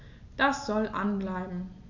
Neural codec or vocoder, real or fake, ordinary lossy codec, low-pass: none; real; none; 7.2 kHz